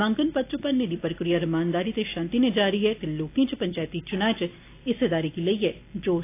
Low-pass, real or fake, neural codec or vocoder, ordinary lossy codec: 3.6 kHz; real; none; AAC, 24 kbps